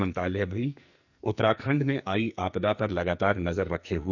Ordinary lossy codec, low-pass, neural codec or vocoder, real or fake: none; 7.2 kHz; codec, 44.1 kHz, 2.6 kbps, SNAC; fake